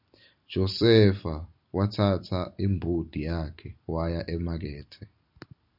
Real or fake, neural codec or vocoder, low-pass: fake; vocoder, 44.1 kHz, 128 mel bands every 256 samples, BigVGAN v2; 5.4 kHz